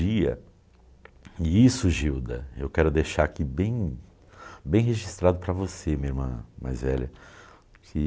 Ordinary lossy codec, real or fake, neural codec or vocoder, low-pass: none; real; none; none